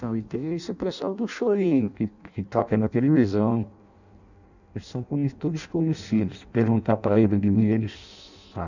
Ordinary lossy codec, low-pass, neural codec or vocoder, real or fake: none; 7.2 kHz; codec, 16 kHz in and 24 kHz out, 0.6 kbps, FireRedTTS-2 codec; fake